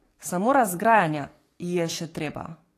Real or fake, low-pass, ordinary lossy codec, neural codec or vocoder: fake; 14.4 kHz; AAC, 48 kbps; codec, 44.1 kHz, 7.8 kbps, DAC